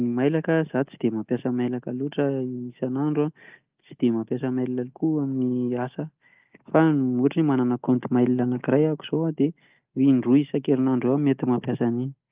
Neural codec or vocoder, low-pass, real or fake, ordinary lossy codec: none; 3.6 kHz; real; Opus, 32 kbps